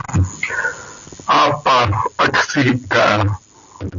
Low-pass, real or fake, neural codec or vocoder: 7.2 kHz; real; none